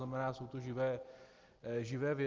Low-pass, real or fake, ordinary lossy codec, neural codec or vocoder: 7.2 kHz; real; Opus, 16 kbps; none